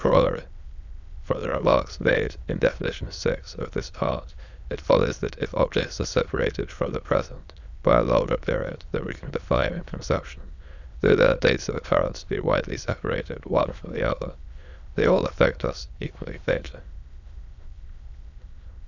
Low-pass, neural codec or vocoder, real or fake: 7.2 kHz; autoencoder, 22.05 kHz, a latent of 192 numbers a frame, VITS, trained on many speakers; fake